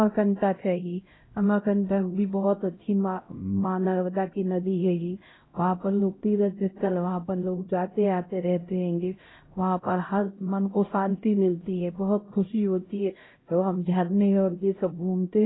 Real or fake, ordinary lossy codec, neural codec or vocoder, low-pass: fake; AAC, 16 kbps; codec, 16 kHz, 0.5 kbps, X-Codec, HuBERT features, trained on LibriSpeech; 7.2 kHz